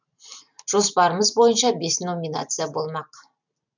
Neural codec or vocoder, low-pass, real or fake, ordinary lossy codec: none; 7.2 kHz; real; none